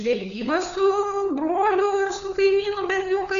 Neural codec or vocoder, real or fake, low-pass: codec, 16 kHz, 4 kbps, FunCodec, trained on LibriTTS, 50 frames a second; fake; 7.2 kHz